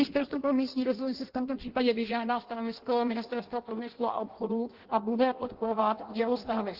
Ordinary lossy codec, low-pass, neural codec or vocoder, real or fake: Opus, 16 kbps; 5.4 kHz; codec, 16 kHz in and 24 kHz out, 0.6 kbps, FireRedTTS-2 codec; fake